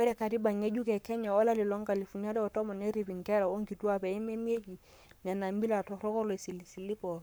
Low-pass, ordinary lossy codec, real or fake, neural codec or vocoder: none; none; fake; codec, 44.1 kHz, 7.8 kbps, Pupu-Codec